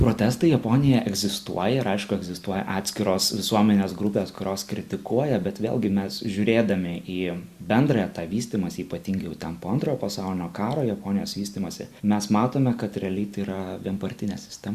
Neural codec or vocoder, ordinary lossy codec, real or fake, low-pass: none; Opus, 64 kbps; real; 14.4 kHz